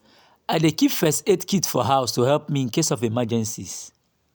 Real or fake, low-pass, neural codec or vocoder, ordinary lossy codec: real; none; none; none